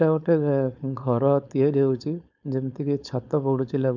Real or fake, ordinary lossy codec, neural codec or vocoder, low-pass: fake; none; codec, 16 kHz, 4.8 kbps, FACodec; 7.2 kHz